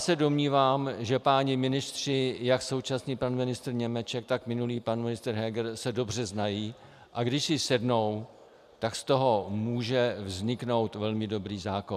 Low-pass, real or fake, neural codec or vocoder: 14.4 kHz; real; none